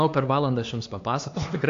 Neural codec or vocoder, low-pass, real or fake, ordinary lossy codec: codec, 16 kHz, 2 kbps, X-Codec, HuBERT features, trained on LibriSpeech; 7.2 kHz; fake; AAC, 48 kbps